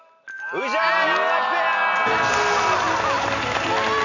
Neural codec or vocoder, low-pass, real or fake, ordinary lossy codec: none; 7.2 kHz; real; none